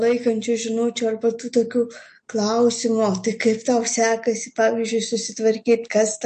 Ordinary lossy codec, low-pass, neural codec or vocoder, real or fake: MP3, 48 kbps; 9.9 kHz; none; real